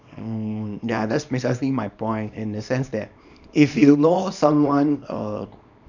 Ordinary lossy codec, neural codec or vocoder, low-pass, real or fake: none; codec, 24 kHz, 0.9 kbps, WavTokenizer, small release; 7.2 kHz; fake